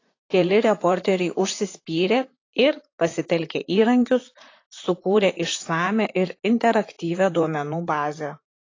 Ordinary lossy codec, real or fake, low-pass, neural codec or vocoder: AAC, 32 kbps; fake; 7.2 kHz; vocoder, 44.1 kHz, 128 mel bands, Pupu-Vocoder